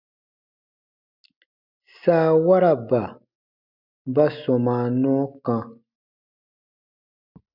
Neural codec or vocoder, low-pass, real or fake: none; 5.4 kHz; real